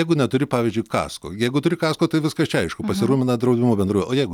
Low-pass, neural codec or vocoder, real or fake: 19.8 kHz; none; real